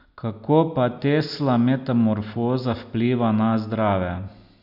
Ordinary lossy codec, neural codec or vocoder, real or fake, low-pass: none; none; real; 5.4 kHz